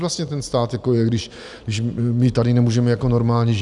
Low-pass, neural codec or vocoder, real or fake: 10.8 kHz; none; real